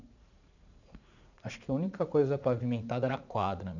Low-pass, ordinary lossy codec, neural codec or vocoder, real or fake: 7.2 kHz; none; vocoder, 44.1 kHz, 80 mel bands, Vocos; fake